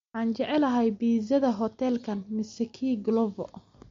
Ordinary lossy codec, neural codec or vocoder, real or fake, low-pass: MP3, 48 kbps; none; real; 7.2 kHz